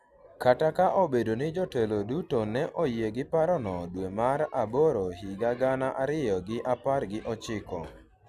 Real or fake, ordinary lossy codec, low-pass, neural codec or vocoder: real; none; 14.4 kHz; none